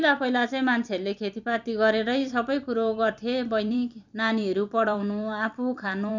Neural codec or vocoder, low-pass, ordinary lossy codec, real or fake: vocoder, 44.1 kHz, 128 mel bands every 256 samples, BigVGAN v2; 7.2 kHz; none; fake